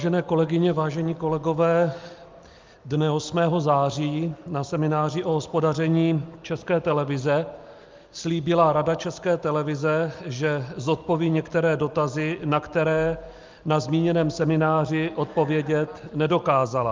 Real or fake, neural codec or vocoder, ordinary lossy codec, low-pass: real; none; Opus, 32 kbps; 7.2 kHz